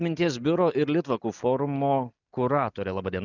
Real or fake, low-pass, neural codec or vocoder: real; 7.2 kHz; none